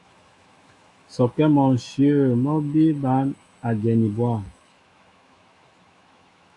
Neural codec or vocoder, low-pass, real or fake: autoencoder, 48 kHz, 128 numbers a frame, DAC-VAE, trained on Japanese speech; 10.8 kHz; fake